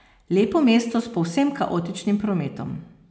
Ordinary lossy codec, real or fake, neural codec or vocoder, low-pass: none; real; none; none